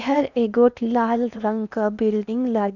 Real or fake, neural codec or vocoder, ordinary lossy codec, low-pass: fake; codec, 16 kHz in and 24 kHz out, 0.8 kbps, FocalCodec, streaming, 65536 codes; none; 7.2 kHz